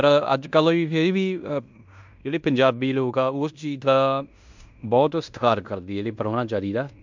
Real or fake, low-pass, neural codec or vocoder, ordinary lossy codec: fake; 7.2 kHz; codec, 16 kHz in and 24 kHz out, 0.9 kbps, LongCat-Audio-Codec, fine tuned four codebook decoder; MP3, 64 kbps